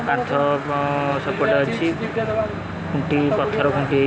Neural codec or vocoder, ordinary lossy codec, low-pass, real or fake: none; none; none; real